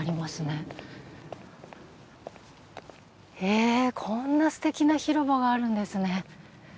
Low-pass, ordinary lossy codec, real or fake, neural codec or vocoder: none; none; real; none